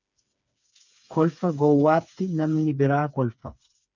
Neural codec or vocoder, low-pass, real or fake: codec, 16 kHz, 4 kbps, FreqCodec, smaller model; 7.2 kHz; fake